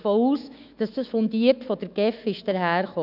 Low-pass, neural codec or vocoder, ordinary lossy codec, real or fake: 5.4 kHz; autoencoder, 48 kHz, 128 numbers a frame, DAC-VAE, trained on Japanese speech; none; fake